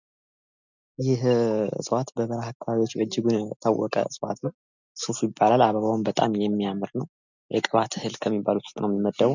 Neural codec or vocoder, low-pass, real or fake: none; 7.2 kHz; real